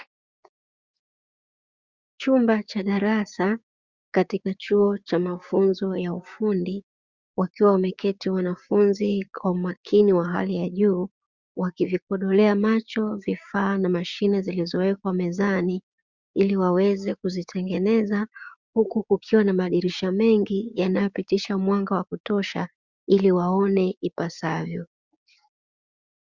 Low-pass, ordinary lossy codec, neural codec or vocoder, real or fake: 7.2 kHz; Opus, 64 kbps; codec, 16 kHz, 6 kbps, DAC; fake